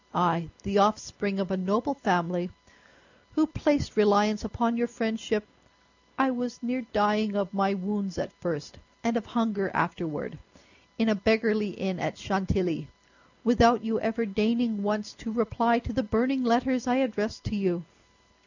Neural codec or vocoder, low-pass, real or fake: none; 7.2 kHz; real